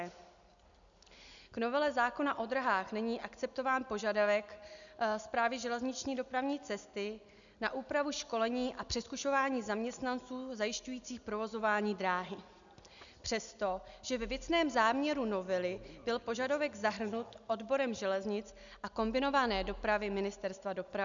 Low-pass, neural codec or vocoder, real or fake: 7.2 kHz; none; real